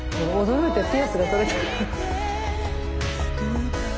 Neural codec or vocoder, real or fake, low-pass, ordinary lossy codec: none; real; none; none